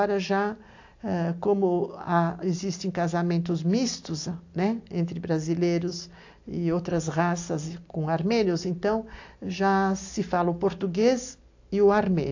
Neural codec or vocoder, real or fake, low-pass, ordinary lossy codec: none; real; 7.2 kHz; AAC, 48 kbps